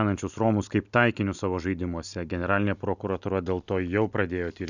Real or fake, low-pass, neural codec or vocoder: real; 7.2 kHz; none